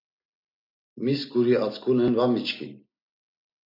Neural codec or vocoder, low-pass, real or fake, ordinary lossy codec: none; 5.4 kHz; real; MP3, 32 kbps